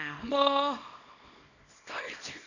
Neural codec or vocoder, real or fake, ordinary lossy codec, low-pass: codec, 24 kHz, 0.9 kbps, WavTokenizer, small release; fake; none; 7.2 kHz